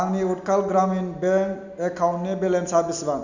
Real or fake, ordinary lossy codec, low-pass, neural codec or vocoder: real; none; 7.2 kHz; none